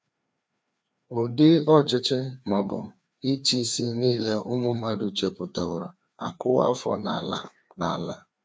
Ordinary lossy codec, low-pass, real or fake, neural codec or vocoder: none; none; fake; codec, 16 kHz, 2 kbps, FreqCodec, larger model